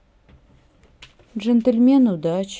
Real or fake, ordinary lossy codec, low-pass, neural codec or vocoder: real; none; none; none